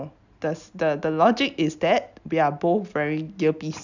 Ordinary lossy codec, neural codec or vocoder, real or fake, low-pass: none; none; real; 7.2 kHz